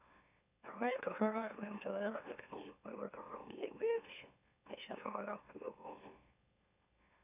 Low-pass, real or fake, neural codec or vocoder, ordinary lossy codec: 3.6 kHz; fake; autoencoder, 44.1 kHz, a latent of 192 numbers a frame, MeloTTS; none